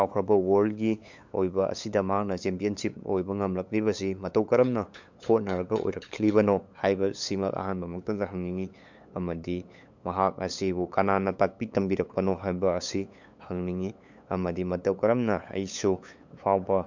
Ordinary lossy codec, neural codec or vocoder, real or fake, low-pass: MP3, 64 kbps; codec, 16 kHz, 8 kbps, FunCodec, trained on LibriTTS, 25 frames a second; fake; 7.2 kHz